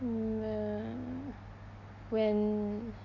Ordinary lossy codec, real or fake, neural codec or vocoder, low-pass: MP3, 48 kbps; real; none; 7.2 kHz